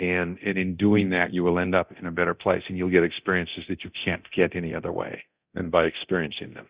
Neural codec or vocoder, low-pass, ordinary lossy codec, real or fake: codec, 24 kHz, 0.9 kbps, DualCodec; 3.6 kHz; Opus, 64 kbps; fake